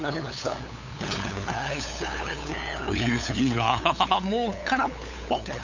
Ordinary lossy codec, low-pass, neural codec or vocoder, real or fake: none; 7.2 kHz; codec, 16 kHz, 8 kbps, FunCodec, trained on LibriTTS, 25 frames a second; fake